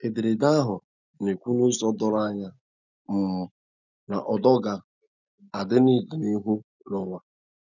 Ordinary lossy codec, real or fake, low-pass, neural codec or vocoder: none; real; 7.2 kHz; none